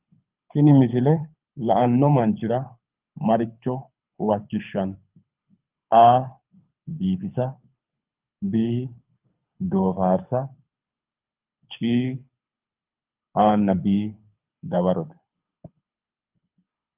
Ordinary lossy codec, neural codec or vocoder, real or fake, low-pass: Opus, 24 kbps; codec, 24 kHz, 6 kbps, HILCodec; fake; 3.6 kHz